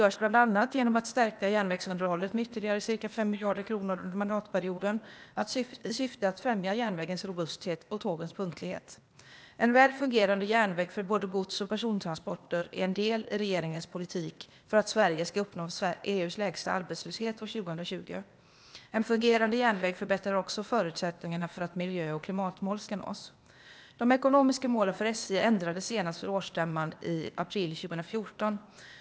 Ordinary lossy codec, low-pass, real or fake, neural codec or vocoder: none; none; fake; codec, 16 kHz, 0.8 kbps, ZipCodec